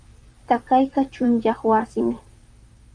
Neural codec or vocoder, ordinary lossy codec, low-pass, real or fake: vocoder, 44.1 kHz, 128 mel bands, Pupu-Vocoder; Opus, 32 kbps; 9.9 kHz; fake